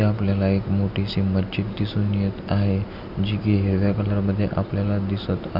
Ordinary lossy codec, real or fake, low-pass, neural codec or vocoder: none; real; 5.4 kHz; none